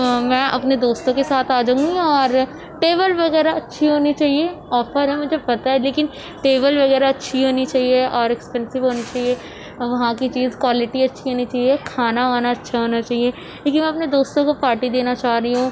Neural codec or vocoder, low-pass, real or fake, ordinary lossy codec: none; none; real; none